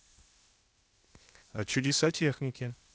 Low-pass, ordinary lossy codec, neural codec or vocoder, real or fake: none; none; codec, 16 kHz, 0.8 kbps, ZipCodec; fake